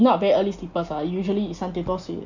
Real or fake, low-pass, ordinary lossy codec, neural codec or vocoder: real; 7.2 kHz; Opus, 64 kbps; none